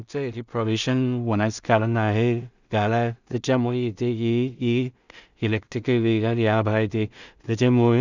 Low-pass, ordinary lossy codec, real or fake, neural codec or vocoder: 7.2 kHz; none; fake; codec, 16 kHz in and 24 kHz out, 0.4 kbps, LongCat-Audio-Codec, two codebook decoder